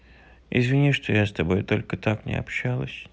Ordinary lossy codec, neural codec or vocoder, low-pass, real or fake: none; none; none; real